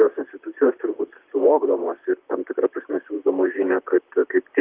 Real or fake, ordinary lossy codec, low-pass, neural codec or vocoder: fake; Opus, 32 kbps; 3.6 kHz; vocoder, 44.1 kHz, 128 mel bands, Pupu-Vocoder